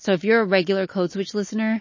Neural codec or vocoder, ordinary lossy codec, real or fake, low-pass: none; MP3, 32 kbps; real; 7.2 kHz